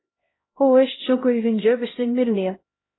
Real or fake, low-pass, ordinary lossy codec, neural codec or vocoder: fake; 7.2 kHz; AAC, 16 kbps; codec, 16 kHz, 0.5 kbps, X-Codec, HuBERT features, trained on LibriSpeech